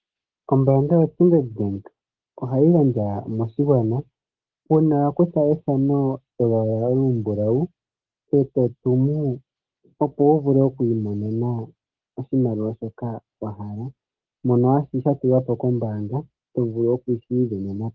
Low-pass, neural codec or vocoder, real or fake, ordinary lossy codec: 7.2 kHz; none; real; Opus, 16 kbps